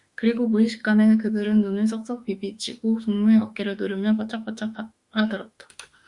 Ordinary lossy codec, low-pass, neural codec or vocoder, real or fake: Opus, 64 kbps; 10.8 kHz; autoencoder, 48 kHz, 32 numbers a frame, DAC-VAE, trained on Japanese speech; fake